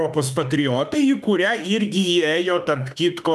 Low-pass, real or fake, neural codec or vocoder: 14.4 kHz; fake; autoencoder, 48 kHz, 32 numbers a frame, DAC-VAE, trained on Japanese speech